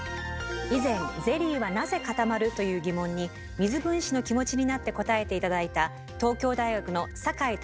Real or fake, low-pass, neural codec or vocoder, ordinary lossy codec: real; none; none; none